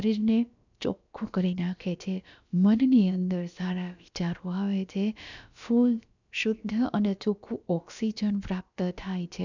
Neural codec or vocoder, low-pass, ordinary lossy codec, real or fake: codec, 16 kHz, about 1 kbps, DyCAST, with the encoder's durations; 7.2 kHz; none; fake